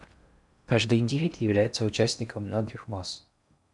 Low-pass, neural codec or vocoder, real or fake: 10.8 kHz; codec, 16 kHz in and 24 kHz out, 0.6 kbps, FocalCodec, streaming, 4096 codes; fake